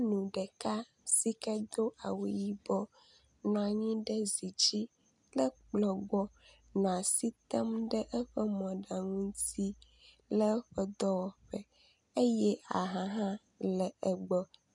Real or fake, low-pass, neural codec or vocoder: real; 10.8 kHz; none